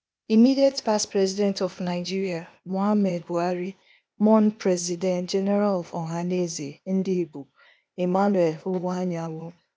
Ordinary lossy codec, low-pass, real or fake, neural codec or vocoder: none; none; fake; codec, 16 kHz, 0.8 kbps, ZipCodec